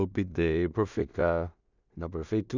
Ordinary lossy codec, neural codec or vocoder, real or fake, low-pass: none; codec, 16 kHz in and 24 kHz out, 0.4 kbps, LongCat-Audio-Codec, two codebook decoder; fake; 7.2 kHz